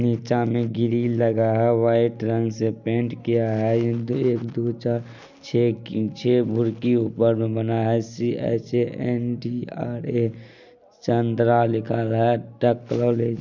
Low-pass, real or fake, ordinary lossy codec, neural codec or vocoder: 7.2 kHz; real; none; none